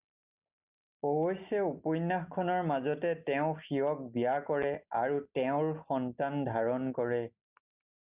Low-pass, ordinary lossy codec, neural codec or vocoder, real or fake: 3.6 kHz; Opus, 64 kbps; none; real